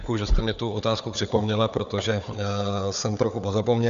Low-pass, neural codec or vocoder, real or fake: 7.2 kHz; codec, 16 kHz, 4 kbps, FunCodec, trained on Chinese and English, 50 frames a second; fake